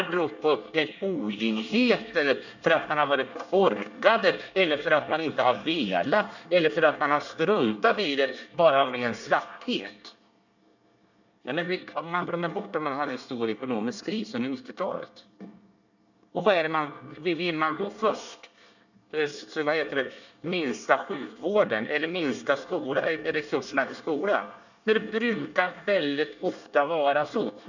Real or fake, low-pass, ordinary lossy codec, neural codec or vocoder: fake; 7.2 kHz; none; codec, 24 kHz, 1 kbps, SNAC